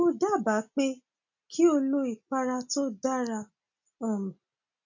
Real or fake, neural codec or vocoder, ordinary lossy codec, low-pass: real; none; none; 7.2 kHz